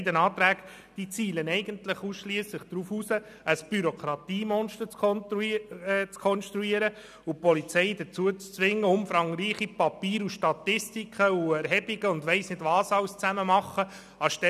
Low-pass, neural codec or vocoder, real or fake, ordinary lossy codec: 14.4 kHz; none; real; none